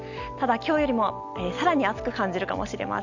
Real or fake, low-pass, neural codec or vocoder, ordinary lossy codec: real; 7.2 kHz; none; none